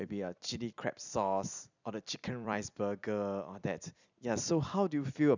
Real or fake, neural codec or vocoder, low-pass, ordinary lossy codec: fake; vocoder, 44.1 kHz, 128 mel bands every 256 samples, BigVGAN v2; 7.2 kHz; none